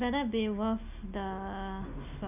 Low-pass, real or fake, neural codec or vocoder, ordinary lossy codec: 3.6 kHz; real; none; none